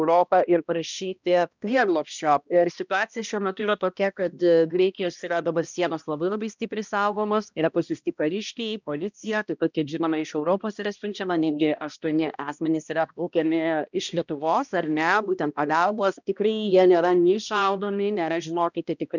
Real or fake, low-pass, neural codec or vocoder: fake; 7.2 kHz; codec, 16 kHz, 1 kbps, X-Codec, HuBERT features, trained on balanced general audio